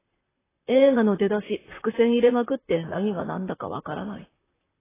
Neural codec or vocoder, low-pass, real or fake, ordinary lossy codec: vocoder, 44.1 kHz, 128 mel bands, Pupu-Vocoder; 3.6 kHz; fake; AAC, 16 kbps